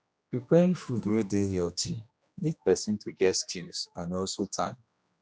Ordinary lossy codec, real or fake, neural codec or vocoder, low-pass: none; fake; codec, 16 kHz, 1 kbps, X-Codec, HuBERT features, trained on general audio; none